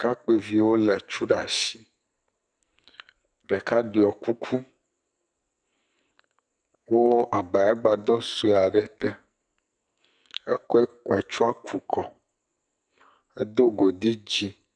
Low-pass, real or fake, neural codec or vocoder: 9.9 kHz; fake; codec, 44.1 kHz, 2.6 kbps, SNAC